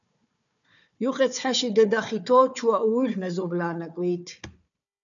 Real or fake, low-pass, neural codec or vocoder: fake; 7.2 kHz; codec, 16 kHz, 4 kbps, FunCodec, trained on Chinese and English, 50 frames a second